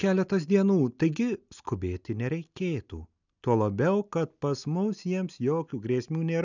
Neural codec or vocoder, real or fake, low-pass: none; real; 7.2 kHz